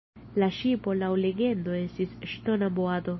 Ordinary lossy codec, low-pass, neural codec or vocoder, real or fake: MP3, 24 kbps; 7.2 kHz; none; real